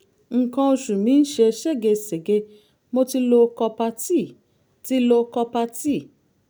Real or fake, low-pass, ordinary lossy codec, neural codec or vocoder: real; none; none; none